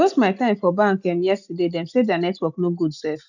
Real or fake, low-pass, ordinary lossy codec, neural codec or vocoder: real; 7.2 kHz; none; none